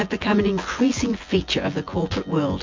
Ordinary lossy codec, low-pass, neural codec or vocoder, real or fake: MP3, 48 kbps; 7.2 kHz; vocoder, 24 kHz, 100 mel bands, Vocos; fake